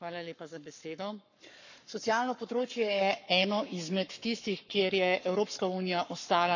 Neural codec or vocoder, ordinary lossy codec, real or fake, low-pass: codec, 44.1 kHz, 7.8 kbps, Pupu-Codec; none; fake; 7.2 kHz